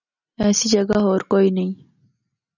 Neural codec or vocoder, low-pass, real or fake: none; 7.2 kHz; real